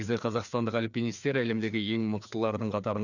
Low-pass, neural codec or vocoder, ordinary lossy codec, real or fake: 7.2 kHz; codec, 16 kHz, 2 kbps, FreqCodec, larger model; none; fake